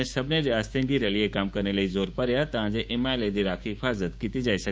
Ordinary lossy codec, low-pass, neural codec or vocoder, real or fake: none; none; codec, 16 kHz, 6 kbps, DAC; fake